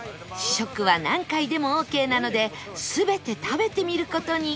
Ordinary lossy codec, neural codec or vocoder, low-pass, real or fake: none; none; none; real